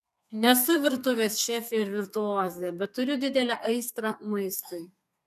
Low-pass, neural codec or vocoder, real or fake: 14.4 kHz; codec, 32 kHz, 1.9 kbps, SNAC; fake